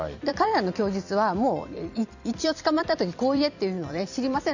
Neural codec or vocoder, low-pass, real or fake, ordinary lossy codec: vocoder, 44.1 kHz, 128 mel bands every 256 samples, BigVGAN v2; 7.2 kHz; fake; none